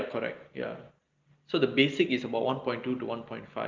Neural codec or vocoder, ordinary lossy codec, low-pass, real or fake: none; Opus, 32 kbps; 7.2 kHz; real